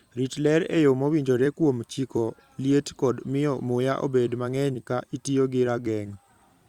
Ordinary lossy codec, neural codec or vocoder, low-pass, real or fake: Opus, 64 kbps; vocoder, 44.1 kHz, 128 mel bands every 256 samples, BigVGAN v2; 19.8 kHz; fake